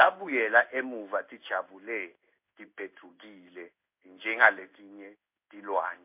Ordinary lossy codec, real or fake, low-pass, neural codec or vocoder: MP3, 32 kbps; fake; 3.6 kHz; codec, 16 kHz in and 24 kHz out, 1 kbps, XY-Tokenizer